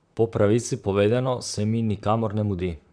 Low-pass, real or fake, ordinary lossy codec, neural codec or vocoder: 9.9 kHz; fake; none; vocoder, 44.1 kHz, 128 mel bands, Pupu-Vocoder